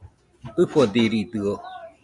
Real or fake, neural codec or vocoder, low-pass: real; none; 10.8 kHz